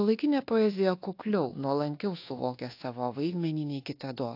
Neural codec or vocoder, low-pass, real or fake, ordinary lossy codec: codec, 24 kHz, 1.2 kbps, DualCodec; 5.4 kHz; fake; AAC, 32 kbps